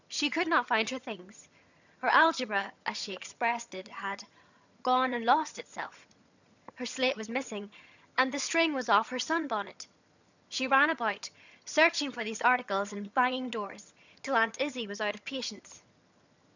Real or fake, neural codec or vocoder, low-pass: fake; vocoder, 22.05 kHz, 80 mel bands, HiFi-GAN; 7.2 kHz